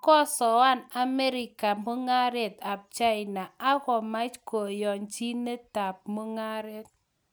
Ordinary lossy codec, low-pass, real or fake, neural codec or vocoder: none; none; real; none